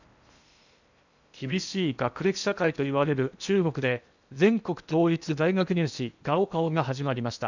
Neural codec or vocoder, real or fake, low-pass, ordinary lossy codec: codec, 16 kHz in and 24 kHz out, 0.8 kbps, FocalCodec, streaming, 65536 codes; fake; 7.2 kHz; none